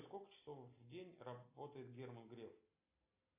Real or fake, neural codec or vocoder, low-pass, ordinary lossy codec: real; none; 3.6 kHz; AAC, 32 kbps